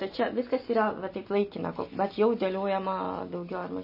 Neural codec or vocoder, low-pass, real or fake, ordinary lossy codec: none; 5.4 kHz; real; MP3, 24 kbps